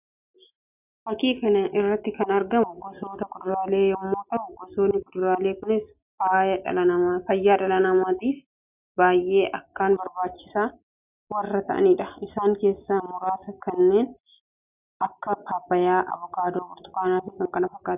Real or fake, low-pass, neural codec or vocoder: real; 3.6 kHz; none